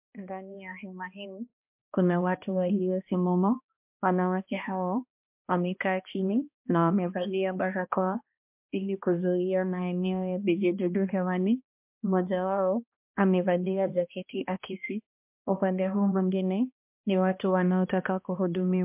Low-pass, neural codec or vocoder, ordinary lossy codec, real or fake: 3.6 kHz; codec, 16 kHz, 1 kbps, X-Codec, HuBERT features, trained on balanced general audio; MP3, 32 kbps; fake